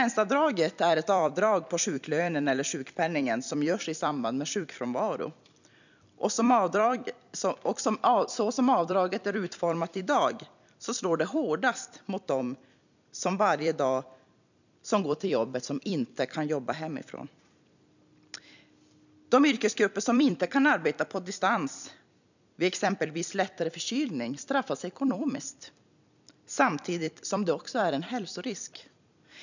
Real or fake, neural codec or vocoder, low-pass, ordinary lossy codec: fake; vocoder, 44.1 kHz, 128 mel bands every 256 samples, BigVGAN v2; 7.2 kHz; none